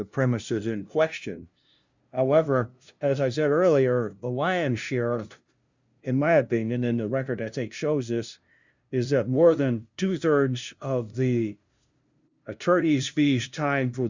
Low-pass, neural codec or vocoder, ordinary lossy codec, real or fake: 7.2 kHz; codec, 16 kHz, 0.5 kbps, FunCodec, trained on LibriTTS, 25 frames a second; Opus, 64 kbps; fake